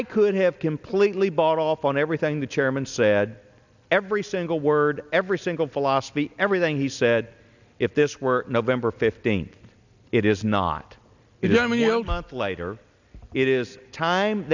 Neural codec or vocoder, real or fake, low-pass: none; real; 7.2 kHz